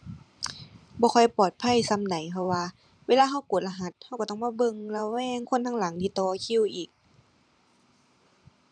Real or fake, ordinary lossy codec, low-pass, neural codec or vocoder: fake; none; 9.9 kHz; vocoder, 44.1 kHz, 128 mel bands every 512 samples, BigVGAN v2